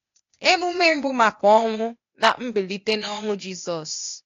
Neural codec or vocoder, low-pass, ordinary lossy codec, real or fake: codec, 16 kHz, 0.8 kbps, ZipCodec; 7.2 kHz; AAC, 48 kbps; fake